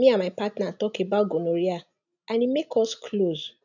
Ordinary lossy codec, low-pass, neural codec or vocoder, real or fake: none; 7.2 kHz; none; real